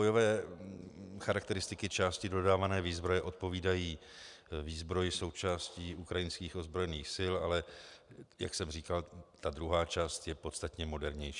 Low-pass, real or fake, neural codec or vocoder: 10.8 kHz; real; none